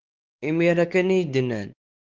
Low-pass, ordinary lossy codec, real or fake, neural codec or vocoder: 7.2 kHz; Opus, 16 kbps; fake; codec, 16 kHz, 4 kbps, X-Codec, WavLM features, trained on Multilingual LibriSpeech